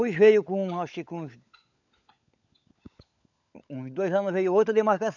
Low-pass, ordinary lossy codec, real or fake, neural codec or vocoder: 7.2 kHz; none; fake; codec, 16 kHz, 16 kbps, FunCodec, trained on LibriTTS, 50 frames a second